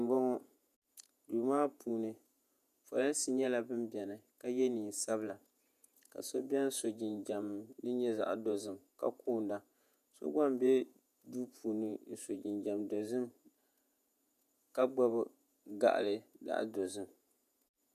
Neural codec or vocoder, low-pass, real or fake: vocoder, 48 kHz, 128 mel bands, Vocos; 14.4 kHz; fake